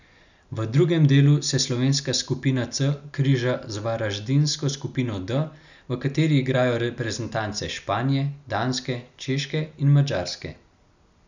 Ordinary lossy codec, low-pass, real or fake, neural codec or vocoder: none; 7.2 kHz; real; none